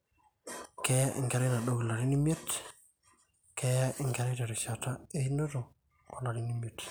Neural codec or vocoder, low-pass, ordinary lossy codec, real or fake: none; none; none; real